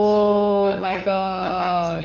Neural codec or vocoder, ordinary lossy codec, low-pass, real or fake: codec, 16 kHz, 8 kbps, FunCodec, trained on LibriTTS, 25 frames a second; none; 7.2 kHz; fake